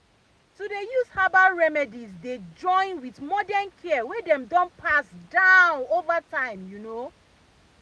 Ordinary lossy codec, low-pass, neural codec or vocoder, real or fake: none; none; none; real